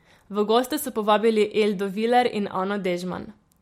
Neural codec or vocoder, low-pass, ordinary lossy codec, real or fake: none; 19.8 kHz; MP3, 64 kbps; real